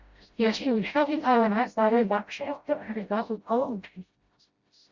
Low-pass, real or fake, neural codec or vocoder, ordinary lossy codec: 7.2 kHz; fake; codec, 16 kHz, 0.5 kbps, FreqCodec, smaller model; Opus, 64 kbps